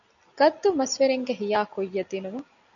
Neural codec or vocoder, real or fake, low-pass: none; real; 7.2 kHz